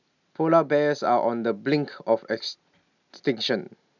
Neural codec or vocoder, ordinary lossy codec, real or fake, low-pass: none; none; real; 7.2 kHz